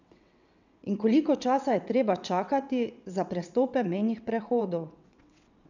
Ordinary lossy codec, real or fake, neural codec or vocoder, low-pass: none; fake; vocoder, 22.05 kHz, 80 mel bands, WaveNeXt; 7.2 kHz